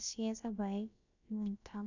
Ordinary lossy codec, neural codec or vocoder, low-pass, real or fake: none; codec, 16 kHz, about 1 kbps, DyCAST, with the encoder's durations; 7.2 kHz; fake